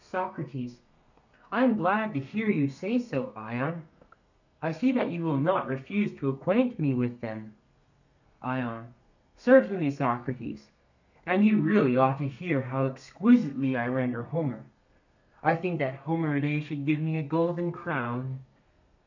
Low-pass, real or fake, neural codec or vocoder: 7.2 kHz; fake; codec, 32 kHz, 1.9 kbps, SNAC